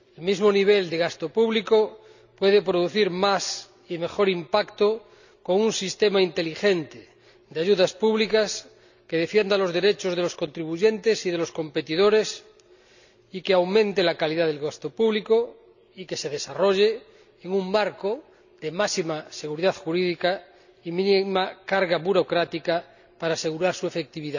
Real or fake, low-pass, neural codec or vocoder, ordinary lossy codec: real; 7.2 kHz; none; none